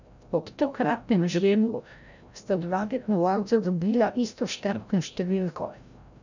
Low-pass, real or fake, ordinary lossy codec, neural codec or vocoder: 7.2 kHz; fake; none; codec, 16 kHz, 0.5 kbps, FreqCodec, larger model